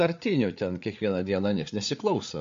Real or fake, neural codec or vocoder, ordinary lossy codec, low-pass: fake; codec, 16 kHz, 8 kbps, FreqCodec, larger model; MP3, 48 kbps; 7.2 kHz